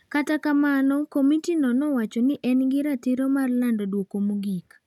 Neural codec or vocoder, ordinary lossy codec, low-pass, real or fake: none; none; 19.8 kHz; real